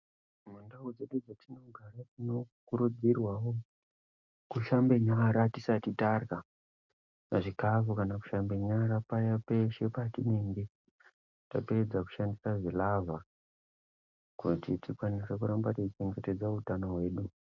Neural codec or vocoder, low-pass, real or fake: none; 7.2 kHz; real